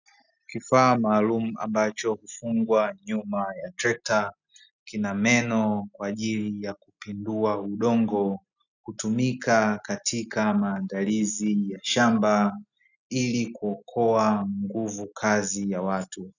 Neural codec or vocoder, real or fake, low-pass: none; real; 7.2 kHz